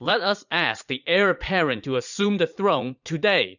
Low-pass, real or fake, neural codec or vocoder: 7.2 kHz; fake; vocoder, 44.1 kHz, 80 mel bands, Vocos